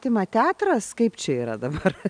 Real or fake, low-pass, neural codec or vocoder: real; 9.9 kHz; none